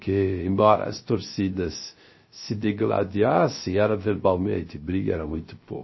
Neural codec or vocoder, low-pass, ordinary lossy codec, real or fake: codec, 16 kHz, 0.3 kbps, FocalCodec; 7.2 kHz; MP3, 24 kbps; fake